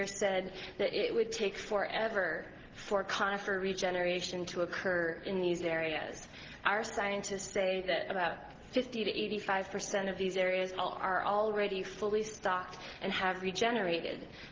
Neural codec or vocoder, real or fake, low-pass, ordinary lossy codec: none; real; 7.2 kHz; Opus, 16 kbps